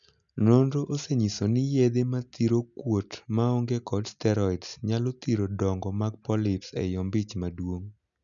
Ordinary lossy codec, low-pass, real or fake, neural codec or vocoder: none; 7.2 kHz; real; none